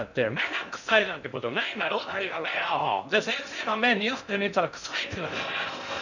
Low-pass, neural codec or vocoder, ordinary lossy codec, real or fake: 7.2 kHz; codec, 16 kHz in and 24 kHz out, 0.6 kbps, FocalCodec, streaming, 2048 codes; none; fake